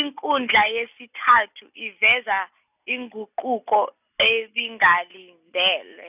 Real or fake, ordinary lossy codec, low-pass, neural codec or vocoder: real; none; 3.6 kHz; none